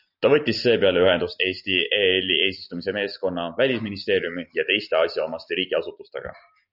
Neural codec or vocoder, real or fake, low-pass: none; real; 5.4 kHz